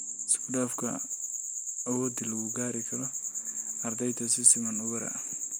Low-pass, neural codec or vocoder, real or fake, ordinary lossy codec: none; none; real; none